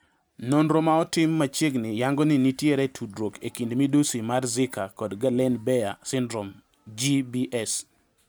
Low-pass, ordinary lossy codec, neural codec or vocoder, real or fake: none; none; none; real